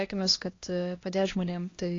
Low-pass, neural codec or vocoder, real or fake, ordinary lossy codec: 7.2 kHz; codec, 16 kHz, 1 kbps, X-Codec, HuBERT features, trained on LibriSpeech; fake; AAC, 32 kbps